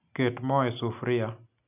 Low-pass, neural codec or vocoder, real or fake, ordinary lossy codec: 3.6 kHz; none; real; none